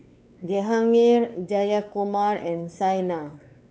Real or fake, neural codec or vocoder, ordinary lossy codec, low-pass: fake; codec, 16 kHz, 4 kbps, X-Codec, WavLM features, trained on Multilingual LibriSpeech; none; none